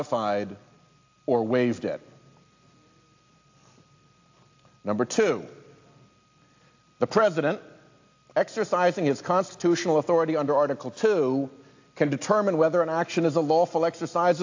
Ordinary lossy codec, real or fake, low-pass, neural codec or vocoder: AAC, 48 kbps; real; 7.2 kHz; none